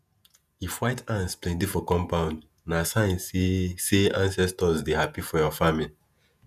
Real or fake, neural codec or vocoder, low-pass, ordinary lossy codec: fake; vocoder, 48 kHz, 128 mel bands, Vocos; 14.4 kHz; none